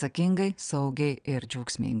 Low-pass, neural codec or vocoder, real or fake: 9.9 kHz; vocoder, 22.05 kHz, 80 mel bands, WaveNeXt; fake